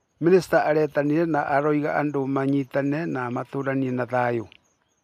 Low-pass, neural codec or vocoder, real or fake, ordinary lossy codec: 14.4 kHz; none; real; none